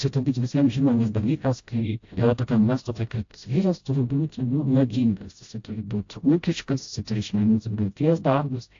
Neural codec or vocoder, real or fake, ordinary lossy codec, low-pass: codec, 16 kHz, 0.5 kbps, FreqCodec, smaller model; fake; AAC, 48 kbps; 7.2 kHz